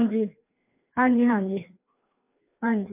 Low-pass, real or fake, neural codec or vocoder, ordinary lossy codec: 3.6 kHz; fake; codec, 16 kHz, 2 kbps, FreqCodec, larger model; MP3, 32 kbps